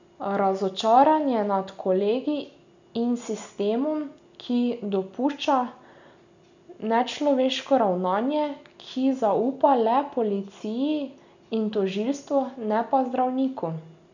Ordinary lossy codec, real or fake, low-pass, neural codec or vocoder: none; real; 7.2 kHz; none